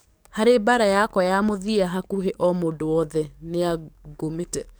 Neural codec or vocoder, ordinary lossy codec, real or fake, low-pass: codec, 44.1 kHz, 7.8 kbps, DAC; none; fake; none